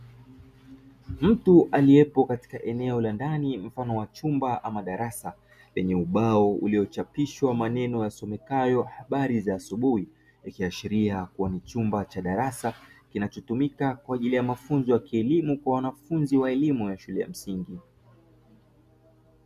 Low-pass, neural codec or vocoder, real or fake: 14.4 kHz; none; real